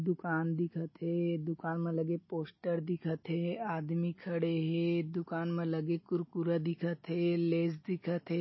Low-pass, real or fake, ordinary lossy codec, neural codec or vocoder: 7.2 kHz; real; MP3, 24 kbps; none